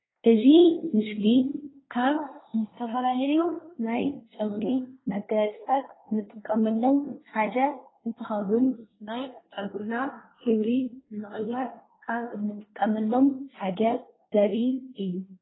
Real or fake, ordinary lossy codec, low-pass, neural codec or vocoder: fake; AAC, 16 kbps; 7.2 kHz; codec, 24 kHz, 1 kbps, SNAC